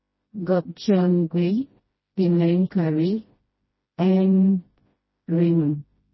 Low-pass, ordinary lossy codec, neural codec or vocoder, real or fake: 7.2 kHz; MP3, 24 kbps; codec, 16 kHz, 1 kbps, FreqCodec, smaller model; fake